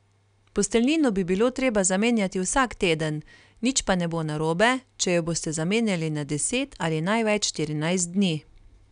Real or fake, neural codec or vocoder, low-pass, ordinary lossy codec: real; none; 9.9 kHz; none